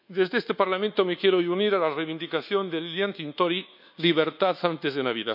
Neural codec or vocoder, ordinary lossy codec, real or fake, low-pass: codec, 24 kHz, 1.2 kbps, DualCodec; none; fake; 5.4 kHz